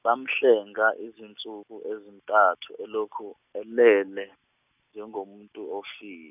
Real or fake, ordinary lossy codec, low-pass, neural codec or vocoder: real; none; 3.6 kHz; none